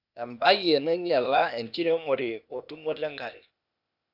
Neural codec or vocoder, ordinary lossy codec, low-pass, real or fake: codec, 16 kHz, 0.8 kbps, ZipCodec; none; 5.4 kHz; fake